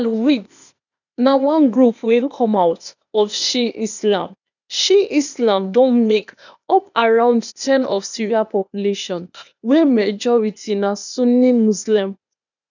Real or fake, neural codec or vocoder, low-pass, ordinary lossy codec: fake; codec, 16 kHz, 0.8 kbps, ZipCodec; 7.2 kHz; none